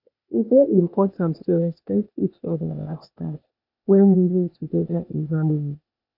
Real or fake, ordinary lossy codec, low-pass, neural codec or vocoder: fake; none; 5.4 kHz; codec, 16 kHz, 0.8 kbps, ZipCodec